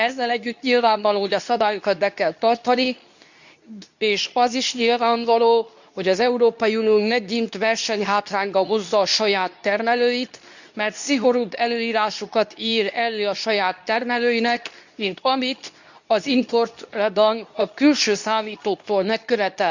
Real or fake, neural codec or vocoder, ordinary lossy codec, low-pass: fake; codec, 24 kHz, 0.9 kbps, WavTokenizer, medium speech release version 2; none; 7.2 kHz